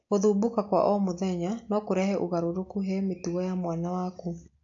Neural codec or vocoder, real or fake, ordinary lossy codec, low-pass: none; real; AAC, 48 kbps; 7.2 kHz